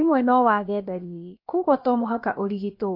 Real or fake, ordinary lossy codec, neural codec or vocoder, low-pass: fake; MP3, 32 kbps; codec, 16 kHz, about 1 kbps, DyCAST, with the encoder's durations; 5.4 kHz